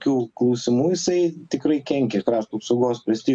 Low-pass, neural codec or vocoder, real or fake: 9.9 kHz; none; real